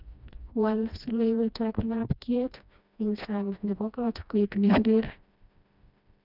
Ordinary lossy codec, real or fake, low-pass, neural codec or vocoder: none; fake; 5.4 kHz; codec, 16 kHz, 1 kbps, FreqCodec, smaller model